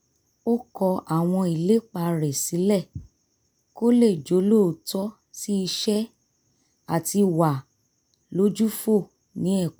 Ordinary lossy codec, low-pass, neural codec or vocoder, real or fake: none; none; none; real